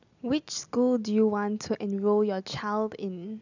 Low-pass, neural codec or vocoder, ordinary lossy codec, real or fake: 7.2 kHz; none; none; real